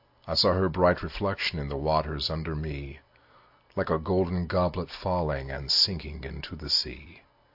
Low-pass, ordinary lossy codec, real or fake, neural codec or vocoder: 5.4 kHz; AAC, 48 kbps; real; none